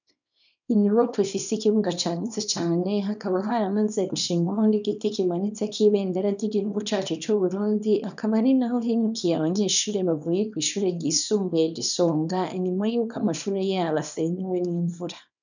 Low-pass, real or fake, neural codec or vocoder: 7.2 kHz; fake; codec, 24 kHz, 0.9 kbps, WavTokenizer, small release